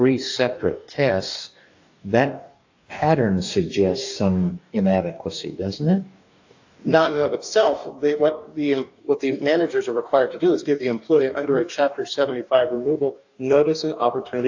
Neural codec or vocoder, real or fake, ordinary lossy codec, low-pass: codec, 44.1 kHz, 2.6 kbps, DAC; fake; AAC, 48 kbps; 7.2 kHz